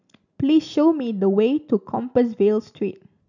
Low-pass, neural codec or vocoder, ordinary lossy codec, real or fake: 7.2 kHz; none; none; real